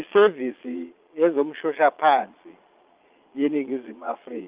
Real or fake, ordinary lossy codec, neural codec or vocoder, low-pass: fake; Opus, 24 kbps; vocoder, 22.05 kHz, 80 mel bands, Vocos; 3.6 kHz